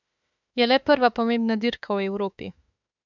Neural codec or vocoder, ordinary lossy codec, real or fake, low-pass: autoencoder, 48 kHz, 32 numbers a frame, DAC-VAE, trained on Japanese speech; none; fake; 7.2 kHz